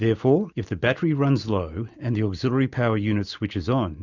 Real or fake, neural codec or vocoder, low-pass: real; none; 7.2 kHz